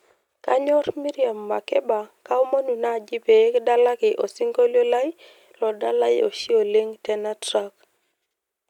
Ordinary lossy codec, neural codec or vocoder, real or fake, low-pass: none; none; real; 19.8 kHz